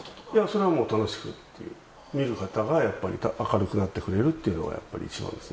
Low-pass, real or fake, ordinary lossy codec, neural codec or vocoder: none; real; none; none